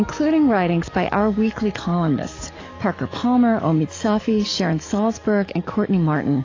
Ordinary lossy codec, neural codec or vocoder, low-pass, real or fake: AAC, 32 kbps; codec, 44.1 kHz, 7.8 kbps, DAC; 7.2 kHz; fake